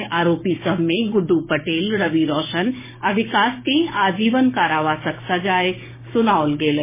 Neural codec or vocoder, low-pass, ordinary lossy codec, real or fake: codec, 16 kHz, 6 kbps, DAC; 3.6 kHz; MP3, 16 kbps; fake